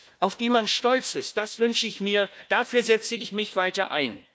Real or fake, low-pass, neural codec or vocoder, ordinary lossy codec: fake; none; codec, 16 kHz, 1 kbps, FunCodec, trained on Chinese and English, 50 frames a second; none